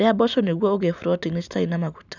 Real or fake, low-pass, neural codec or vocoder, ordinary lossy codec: real; 7.2 kHz; none; none